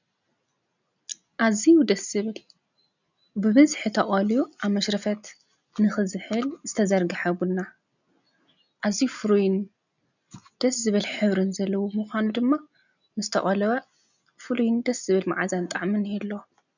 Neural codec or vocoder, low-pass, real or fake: none; 7.2 kHz; real